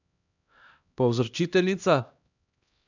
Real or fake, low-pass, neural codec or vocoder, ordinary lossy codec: fake; 7.2 kHz; codec, 16 kHz, 1 kbps, X-Codec, HuBERT features, trained on LibriSpeech; none